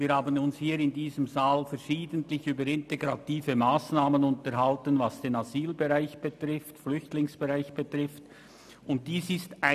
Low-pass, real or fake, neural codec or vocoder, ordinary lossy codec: 14.4 kHz; real; none; none